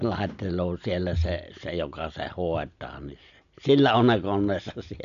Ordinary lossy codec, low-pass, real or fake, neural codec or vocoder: none; 7.2 kHz; real; none